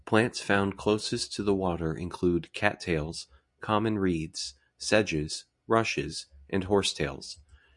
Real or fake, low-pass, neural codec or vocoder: real; 10.8 kHz; none